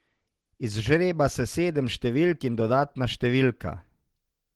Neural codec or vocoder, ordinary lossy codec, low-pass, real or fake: none; Opus, 16 kbps; 19.8 kHz; real